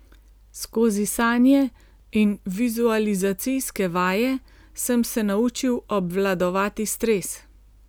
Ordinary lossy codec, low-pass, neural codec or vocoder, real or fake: none; none; none; real